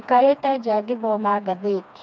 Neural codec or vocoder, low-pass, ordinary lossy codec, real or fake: codec, 16 kHz, 2 kbps, FreqCodec, smaller model; none; none; fake